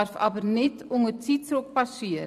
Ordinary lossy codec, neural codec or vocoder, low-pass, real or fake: none; vocoder, 44.1 kHz, 128 mel bands every 512 samples, BigVGAN v2; 14.4 kHz; fake